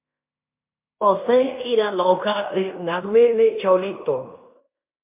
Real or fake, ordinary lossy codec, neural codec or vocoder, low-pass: fake; MP3, 32 kbps; codec, 16 kHz in and 24 kHz out, 0.9 kbps, LongCat-Audio-Codec, fine tuned four codebook decoder; 3.6 kHz